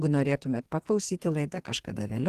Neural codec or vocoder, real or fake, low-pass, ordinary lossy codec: codec, 44.1 kHz, 2.6 kbps, SNAC; fake; 14.4 kHz; Opus, 16 kbps